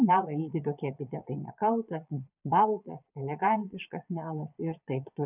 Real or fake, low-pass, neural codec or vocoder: fake; 3.6 kHz; vocoder, 22.05 kHz, 80 mel bands, Vocos